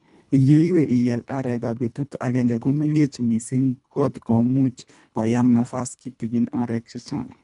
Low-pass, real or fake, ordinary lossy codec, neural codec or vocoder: 10.8 kHz; fake; none; codec, 24 kHz, 1.5 kbps, HILCodec